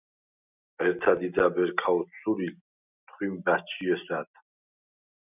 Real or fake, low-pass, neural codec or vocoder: real; 3.6 kHz; none